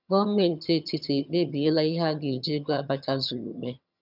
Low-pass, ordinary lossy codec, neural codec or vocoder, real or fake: 5.4 kHz; none; vocoder, 22.05 kHz, 80 mel bands, HiFi-GAN; fake